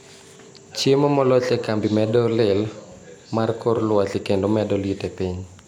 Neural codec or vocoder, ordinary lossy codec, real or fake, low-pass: none; none; real; 19.8 kHz